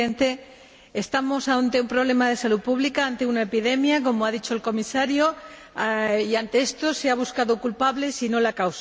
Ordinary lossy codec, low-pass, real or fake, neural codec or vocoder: none; none; real; none